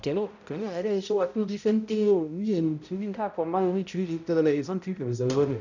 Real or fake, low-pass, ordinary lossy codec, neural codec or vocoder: fake; 7.2 kHz; none; codec, 16 kHz, 0.5 kbps, X-Codec, HuBERT features, trained on balanced general audio